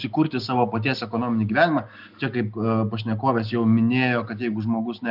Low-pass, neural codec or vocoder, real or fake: 5.4 kHz; none; real